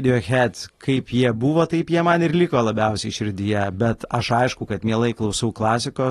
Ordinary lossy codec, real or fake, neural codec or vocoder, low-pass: AAC, 32 kbps; real; none; 19.8 kHz